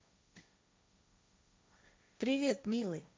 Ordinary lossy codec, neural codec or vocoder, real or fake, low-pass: none; codec, 16 kHz, 1.1 kbps, Voila-Tokenizer; fake; none